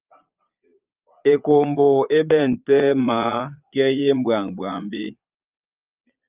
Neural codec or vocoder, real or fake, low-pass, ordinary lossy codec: vocoder, 22.05 kHz, 80 mel bands, Vocos; fake; 3.6 kHz; Opus, 24 kbps